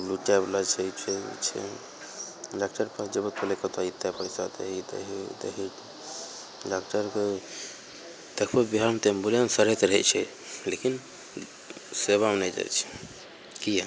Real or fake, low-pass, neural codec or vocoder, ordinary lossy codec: real; none; none; none